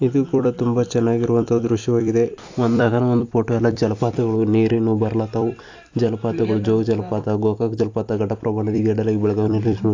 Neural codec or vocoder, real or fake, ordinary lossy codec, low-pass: vocoder, 44.1 kHz, 128 mel bands every 256 samples, BigVGAN v2; fake; AAC, 48 kbps; 7.2 kHz